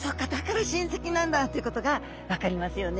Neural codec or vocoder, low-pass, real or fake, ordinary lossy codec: none; none; real; none